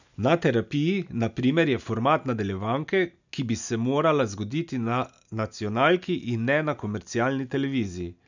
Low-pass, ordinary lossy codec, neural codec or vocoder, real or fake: 7.2 kHz; none; none; real